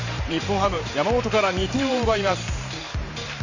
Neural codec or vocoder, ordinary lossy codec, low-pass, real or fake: vocoder, 44.1 kHz, 128 mel bands every 512 samples, BigVGAN v2; Opus, 64 kbps; 7.2 kHz; fake